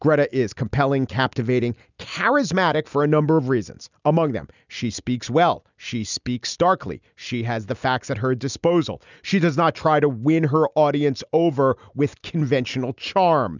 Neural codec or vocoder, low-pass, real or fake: none; 7.2 kHz; real